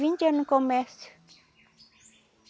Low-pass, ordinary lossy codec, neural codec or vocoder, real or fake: none; none; none; real